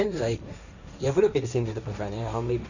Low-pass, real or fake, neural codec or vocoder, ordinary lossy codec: 7.2 kHz; fake; codec, 16 kHz, 1.1 kbps, Voila-Tokenizer; none